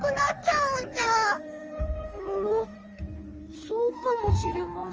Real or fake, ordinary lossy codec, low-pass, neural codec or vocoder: fake; Opus, 24 kbps; 7.2 kHz; codec, 16 kHz in and 24 kHz out, 1.1 kbps, FireRedTTS-2 codec